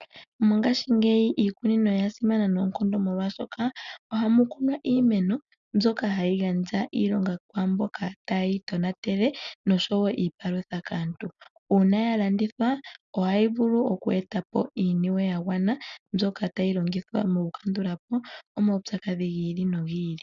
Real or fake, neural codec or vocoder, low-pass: real; none; 7.2 kHz